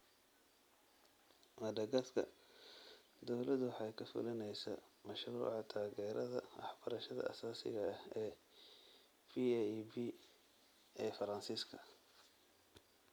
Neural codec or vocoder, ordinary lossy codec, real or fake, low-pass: none; none; real; none